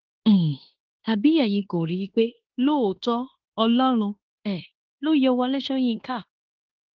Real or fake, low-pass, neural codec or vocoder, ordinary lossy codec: fake; 7.2 kHz; codec, 16 kHz in and 24 kHz out, 0.9 kbps, LongCat-Audio-Codec, fine tuned four codebook decoder; Opus, 16 kbps